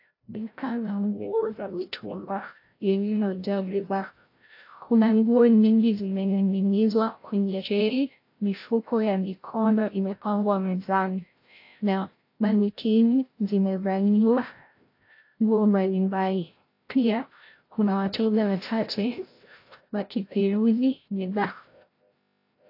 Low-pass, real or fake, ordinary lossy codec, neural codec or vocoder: 5.4 kHz; fake; AAC, 32 kbps; codec, 16 kHz, 0.5 kbps, FreqCodec, larger model